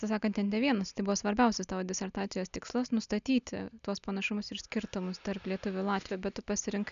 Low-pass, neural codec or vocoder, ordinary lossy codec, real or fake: 7.2 kHz; none; MP3, 96 kbps; real